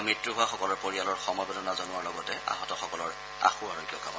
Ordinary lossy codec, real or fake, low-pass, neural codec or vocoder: none; real; none; none